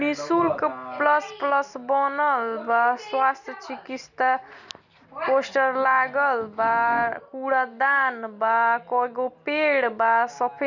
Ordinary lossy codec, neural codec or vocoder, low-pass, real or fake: none; none; 7.2 kHz; real